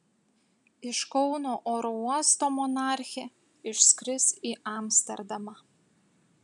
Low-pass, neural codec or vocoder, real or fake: 10.8 kHz; none; real